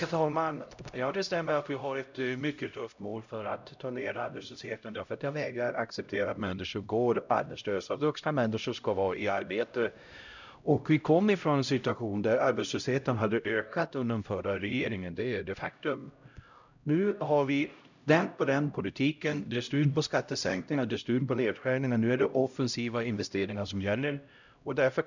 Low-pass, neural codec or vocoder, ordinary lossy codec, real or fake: 7.2 kHz; codec, 16 kHz, 0.5 kbps, X-Codec, HuBERT features, trained on LibriSpeech; none; fake